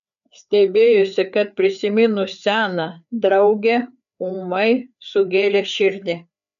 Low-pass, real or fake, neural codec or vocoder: 7.2 kHz; fake; codec, 16 kHz, 4 kbps, FreqCodec, larger model